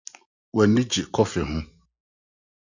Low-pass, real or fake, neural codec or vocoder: 7.2 kHz; real; none